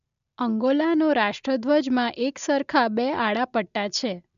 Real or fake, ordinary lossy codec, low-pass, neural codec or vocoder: real; AAC, 96 kbps; 7.2 kHz; none